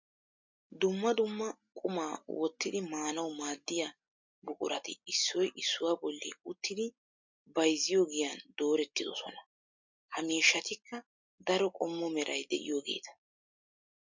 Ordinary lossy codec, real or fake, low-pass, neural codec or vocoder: MP3, 64 kbps; real; 7.2 kHz; none